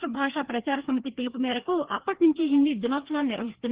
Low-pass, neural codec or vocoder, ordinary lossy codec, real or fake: 3.6 kHz; codec, 16 kHz, 2 kbps, FreqCodec, larger model; Opus, 16 kbps; fake